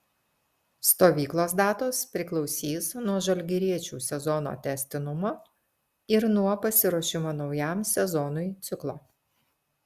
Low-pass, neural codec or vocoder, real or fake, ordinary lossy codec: 14.4 kHz; none; real; Opus, 64 kbps